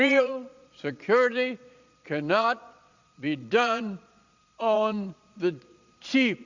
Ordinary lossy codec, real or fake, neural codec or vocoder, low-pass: Opus, 64 kbps; fake; vocoder, 22.05 kHz, 80 mel bands, Vocos; 7.2 kHz